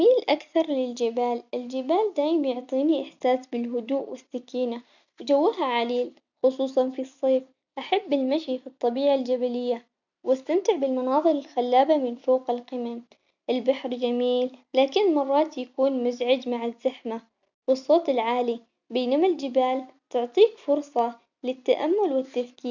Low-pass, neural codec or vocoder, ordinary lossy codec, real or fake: 7.2 kHz; none; none; real